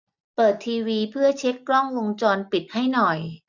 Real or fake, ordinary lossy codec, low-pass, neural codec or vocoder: real; none; 7.2 kHz; none